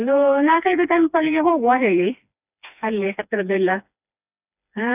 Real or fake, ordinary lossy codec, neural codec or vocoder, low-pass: fake; none; codec, 16 kHz, 2 kbps, FreqCodec, smaller model; 3.6 kHz